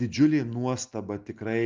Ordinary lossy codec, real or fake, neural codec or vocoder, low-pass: Opus, 32 kbps; real; none; 7.2 kHz